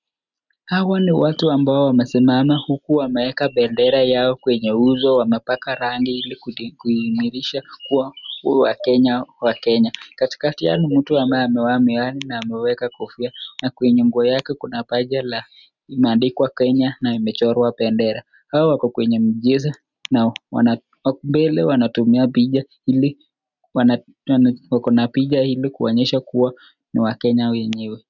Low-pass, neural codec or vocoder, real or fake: 7.2 kHz; none; real